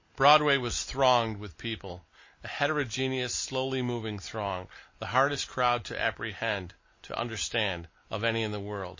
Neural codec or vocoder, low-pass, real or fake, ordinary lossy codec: none; 7.2 kHz; real; MP3, 32 kbps